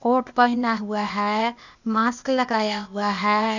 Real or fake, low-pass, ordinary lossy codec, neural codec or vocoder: fake; 7.2 kHz; none; codec, 16 kHz, 0.8 kbps, ZipCodec